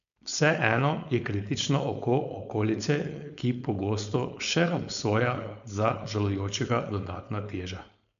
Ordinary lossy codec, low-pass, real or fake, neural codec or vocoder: MP3, 96 kbps; 7.2 kHz; fake; codec, 16 kHz, 4.8 kbps, FACodec